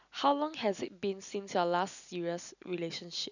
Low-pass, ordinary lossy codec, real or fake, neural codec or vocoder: 7.2 kHz; none; real; none